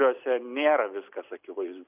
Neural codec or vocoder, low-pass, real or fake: none; 3.6 kHz; real